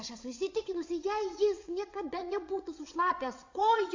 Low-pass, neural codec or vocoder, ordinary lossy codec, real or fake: 7.2 kHz; vocoder, 22.05 kHz, 80 mel bands, WaveNeXt; AAC, 48 kbps; fake